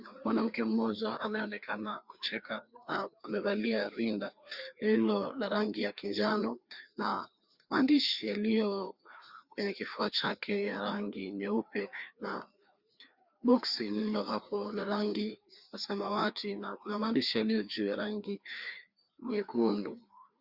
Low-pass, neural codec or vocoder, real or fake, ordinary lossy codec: 5.4 kHz; codec, 16 kHz, 2 kbps, FreqCodec, larger model; fake; Opus, 64 kbps